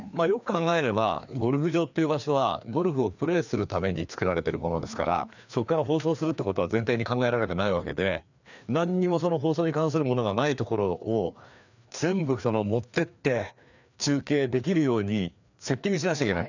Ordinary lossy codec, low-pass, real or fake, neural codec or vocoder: none; 7.2 kHz; fake; codec, 16 kHz, 2 kbps, FreqCodec, larger model